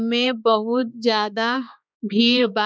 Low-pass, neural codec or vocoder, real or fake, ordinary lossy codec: none; codec, 16 kHz, 2 kbps, X-Codec, HuBERT features, trained on balanced general audio; fake; none